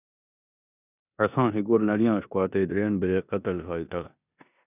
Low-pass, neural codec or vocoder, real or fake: 3.6 kHz; codec, 16 kHz in and 24 kHz out, 0.9 kbps, LongCat-Audio-Codec, four codebook decoder; fake